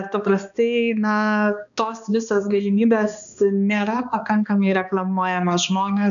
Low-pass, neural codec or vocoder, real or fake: 7.2 kHz; codec, 16 kHz, 2 kbps, X-Codec, HuBERT features, trained on balanced general audio; fake